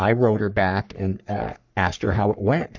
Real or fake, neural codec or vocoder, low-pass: fake; codec, 44.1 kHz, 3.4 kbps, Pupu-Codec; 7.2 kHz